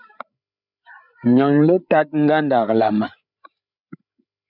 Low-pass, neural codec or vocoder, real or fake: 5.4 kHz; codec, 16 kHz, 8 kbps, FreqCodec, larger model; fake